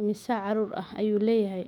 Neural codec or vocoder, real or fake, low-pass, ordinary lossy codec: autoencoder, 48 kHz, 128 numbers a frame, DAC-VAE, trained on Japanese speech; fake; 19.8 kHz; none